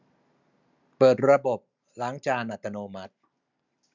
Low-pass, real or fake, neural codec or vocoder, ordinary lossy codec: 7.2 kHz; real; none; none